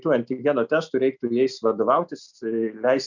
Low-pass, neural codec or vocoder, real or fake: 7.2 kHz; none; real